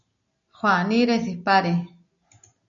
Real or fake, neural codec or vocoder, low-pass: real; none; 7.2 kHz